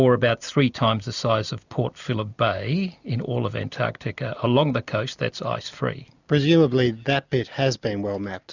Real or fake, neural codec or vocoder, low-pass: real; none; 7.2 kHz